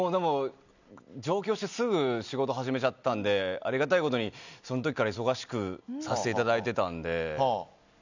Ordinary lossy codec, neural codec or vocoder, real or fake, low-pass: none; none; real; 7.2 kHz